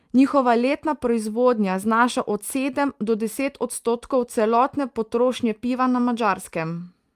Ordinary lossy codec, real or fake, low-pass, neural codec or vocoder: Opus, 32 kbps; real; 14.4 kHz; none